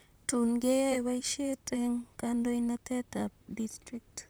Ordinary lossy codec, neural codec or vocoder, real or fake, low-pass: none; vocoder, 44.1 kHz, 128 mel bands, Pupu-Vocoder; fake; none